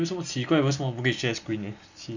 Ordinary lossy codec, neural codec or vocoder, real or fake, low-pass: none; none; real; 7.2 kHz